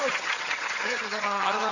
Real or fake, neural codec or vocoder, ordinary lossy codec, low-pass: fake; vocoder, 22.05 kHz, 80 mel bands, HiFi-GAN; none; 7.2 kHz